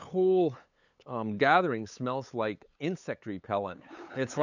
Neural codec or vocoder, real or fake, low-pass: codec, 16 kHz, 8 kbps, FunCodec, trained on LibriTTS, 25 frames a second; fake; 7.2 kHz